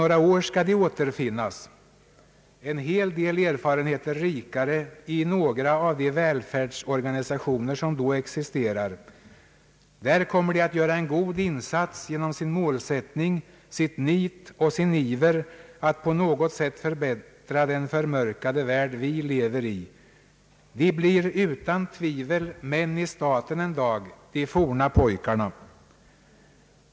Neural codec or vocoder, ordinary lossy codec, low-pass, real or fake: none; none; none; real